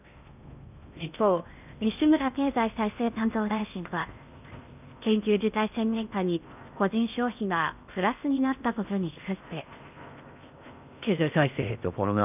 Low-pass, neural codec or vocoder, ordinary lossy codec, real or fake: 3.6 kHz; codec, 16 kHz in and 24 kHz out, 0.6 kbps, FocalCodec, streaming, 4096 codes; none; fake